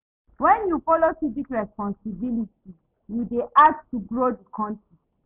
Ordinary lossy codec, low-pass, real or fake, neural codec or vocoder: none; 3.6 kHz; real; none